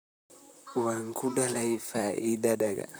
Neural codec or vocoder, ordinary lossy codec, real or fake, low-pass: vocoder, 44.1 kHz, 128 mel bands, Pupu-Vocoder; none; fake; none